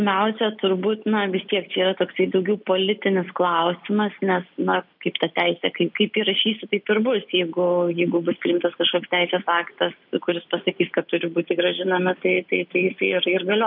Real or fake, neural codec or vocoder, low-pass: real; none; 5.4 kHz